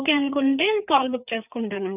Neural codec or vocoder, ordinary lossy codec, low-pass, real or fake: codec, 16 kHz, 4 kbps, FreqCodec, larger model; none; 3.6 kHz; fake